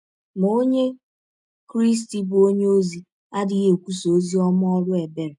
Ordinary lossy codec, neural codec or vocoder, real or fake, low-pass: none; none; real; 10.8 kHz